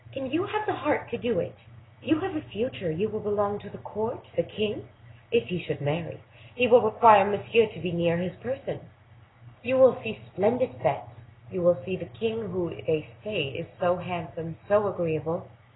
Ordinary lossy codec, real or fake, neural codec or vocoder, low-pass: AAC, 16 kbps; real; none; 7.2 kHz